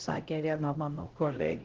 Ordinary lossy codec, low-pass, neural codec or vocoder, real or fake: Opus, 16 kbps; 7.2 kHz; codec, 16 kHz, 0.5 kbps, X-Codec, HuBERT features, trained on LibriSpeech; fake